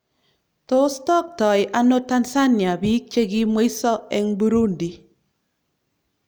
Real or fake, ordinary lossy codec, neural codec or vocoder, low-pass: real; none; none; none